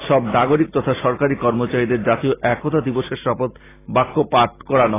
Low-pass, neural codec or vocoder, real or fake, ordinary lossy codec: 3.6 kHz; none; real; AAC, 16 kbps